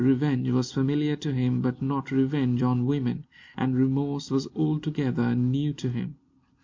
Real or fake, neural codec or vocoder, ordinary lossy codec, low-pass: real; none; MP3, 48 kbps; 7.2 kHz